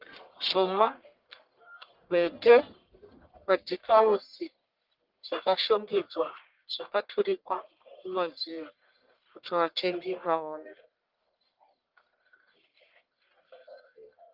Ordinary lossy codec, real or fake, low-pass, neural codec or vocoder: Opus, 32 kbps; fake; 5.4 kHz; codec, 44.1 kHz, 1.7 kbps, Pupu-Codec